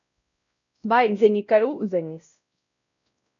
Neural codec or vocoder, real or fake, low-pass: codec, 16 kHz, 0.5 kbps, X-Codec, WavLM features, trained on Multilingual LibriSpeech; fake; 7.2 kHz